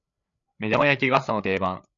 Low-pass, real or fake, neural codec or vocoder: 7.2 kHz; fake; codec, 16 kHz, 8 kbps, FreqCodec, larger model